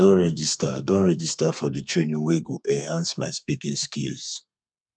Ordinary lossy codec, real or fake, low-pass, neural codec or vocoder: none; fake; 9.9 kHz; codec, 32 kHz, 1.9 kbps, SNAC